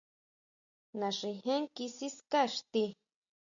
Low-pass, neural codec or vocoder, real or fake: 7.2 kHz; none; real